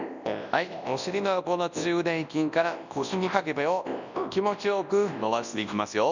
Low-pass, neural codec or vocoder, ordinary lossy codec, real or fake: 7.2 kHz; codec, 24 kHz, 0.9 kbps, WavTokenizer, large speech release; none; fake